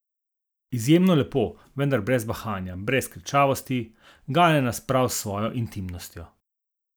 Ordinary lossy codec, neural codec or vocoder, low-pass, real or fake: none; none; none; real